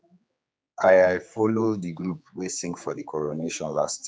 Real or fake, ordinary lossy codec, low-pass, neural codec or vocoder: fake; none; none; codec, 16 kHz, 4 kbps, X-Codec, HuBERT features, trained on general audio